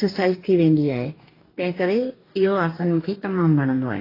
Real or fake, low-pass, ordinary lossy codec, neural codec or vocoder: fake; 5.4 kHz; AAC, 24 kbps; codec, 44.1 kHz, 2.6 kbps, DAC